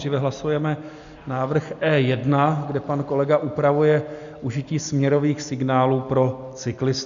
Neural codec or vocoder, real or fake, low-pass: none; real; 7.2 kHz